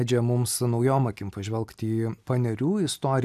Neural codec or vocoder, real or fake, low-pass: none; real; 14.4 kHz